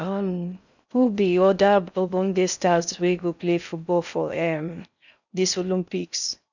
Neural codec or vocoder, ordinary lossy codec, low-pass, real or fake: codec, 16 kHz in and 24 kHz out, 0.6 kbps, FocalCodec, streaming, 4096 codes; none; 7.2 kHz; fake